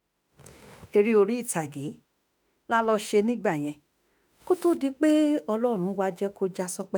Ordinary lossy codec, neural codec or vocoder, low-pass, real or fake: none; autoencoder, 48 kHz, 32 numbers a frame, DAC-VAE, trained on Japanese speech; none; fake